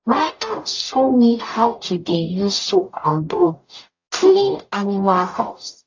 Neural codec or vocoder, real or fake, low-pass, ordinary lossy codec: codec, 44.1 kHz, 0.9 kbps, DAC; fake; 7.2 kHz; AAC, 48 kbps